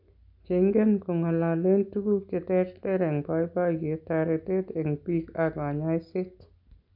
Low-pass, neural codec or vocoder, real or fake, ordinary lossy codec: 5.4 kHz; none; real; none